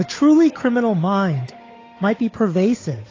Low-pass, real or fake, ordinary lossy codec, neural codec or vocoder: 7.2 kHz; fake; AAC, 32 kbps; codec, 16 kHz, 8 kbps, FunCodec, trained on Chinese and English, 25 frames a second